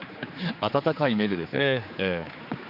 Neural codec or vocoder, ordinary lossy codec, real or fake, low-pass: codec, 16 kHz, 4 kbps, X-Codec, HuBERT features, trained on general audio; none; fake; 5.4 kHz